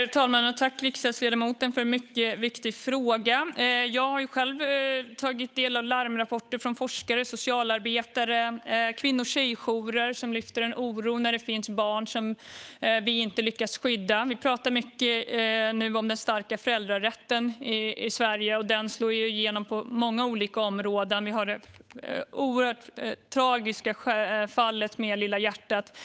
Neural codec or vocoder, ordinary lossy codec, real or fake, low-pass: codec, 16 kHz, 8 kbps, FunCodec, trained on Chinese and English, 25 frames a second; none; fake; none